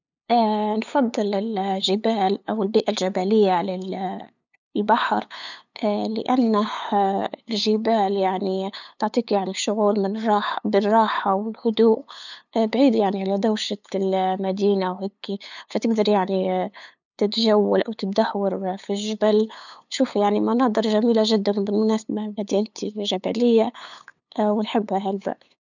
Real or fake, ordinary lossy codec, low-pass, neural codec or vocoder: fake; none; 7.2 kHz; codec, 16 kHz, 8 kbps, FunCodec, trained on LibriTTS, 25 frames a second